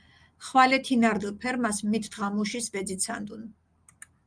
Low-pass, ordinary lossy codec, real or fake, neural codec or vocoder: 9.9 kHz; Opus, 32 kbps; real; none